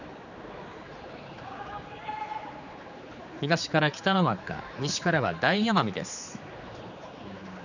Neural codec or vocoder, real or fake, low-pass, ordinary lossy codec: codec, 16 kHz, 4 kbps, X-Codec, HuBERT features, trained on general audio; fake; 7.2 kHz; none